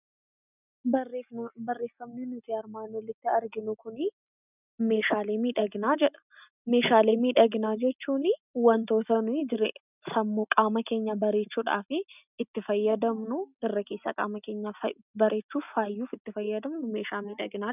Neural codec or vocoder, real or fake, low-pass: none; real; 3.6 kHz